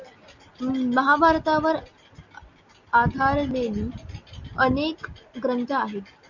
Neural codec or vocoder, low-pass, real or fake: none; 7.2 kHz; real